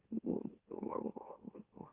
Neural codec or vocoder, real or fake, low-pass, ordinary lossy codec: autoencoder, 44.1 kHz, a latent of 192 numbers a frame, MeloTTS; fake; 3.6 kHz; Opus, 32 kbps